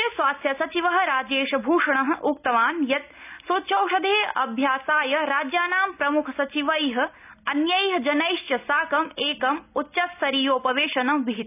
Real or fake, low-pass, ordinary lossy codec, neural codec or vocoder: real; 3.6 kHz; none; none